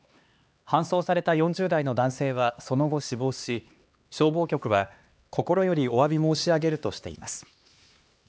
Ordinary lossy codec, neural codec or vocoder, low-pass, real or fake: none; codec, 16 kHz, 2 kbps, X-Codec, HuBERT features, trained on LibriSpeech; none; fake